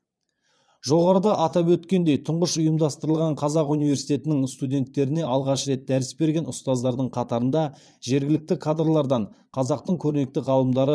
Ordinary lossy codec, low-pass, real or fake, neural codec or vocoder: none; none; fake; vocoder, 22.05 kHz, 80 mel bands, Vocos